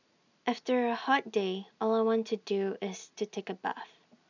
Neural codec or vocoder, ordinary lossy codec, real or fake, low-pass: none; none; real; 7.2 kHz